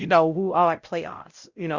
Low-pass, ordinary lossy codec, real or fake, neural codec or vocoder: 7.2 kHz; Opus, 64 kbps; fake; codec, 16 kHz, 0.5 kbps, X-Codec, WavLM features, trained on Multilingual LibriSpeech